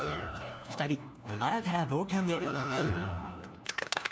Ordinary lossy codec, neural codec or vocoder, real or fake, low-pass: none; codec, 16 kHz, 1 kbps, FunCodec, trained on LibriTTS, 50 frames a second; fake; none